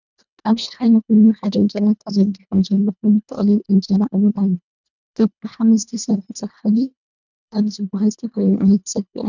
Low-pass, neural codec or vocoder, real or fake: 7.2 kHz; codec, 24 kHz, 1.5 kbps, HILCodec; fake